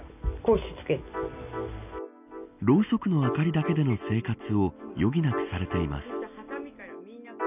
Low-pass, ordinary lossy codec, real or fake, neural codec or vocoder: 3.6 kHz; none; real; none